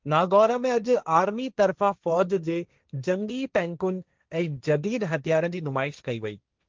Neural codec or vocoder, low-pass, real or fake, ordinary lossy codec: codec, 16 kHz, 1.1 kbps, Voila-Tokenizer; 7.2 kHz; fake; Opus, 24 kbps